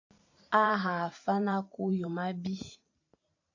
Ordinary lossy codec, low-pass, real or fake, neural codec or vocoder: AAC, 48 kbps; 7.2 kHz; fake; vocoder, 44.1 kHz, 128 mel bands every 512 samples, BigVGAN v2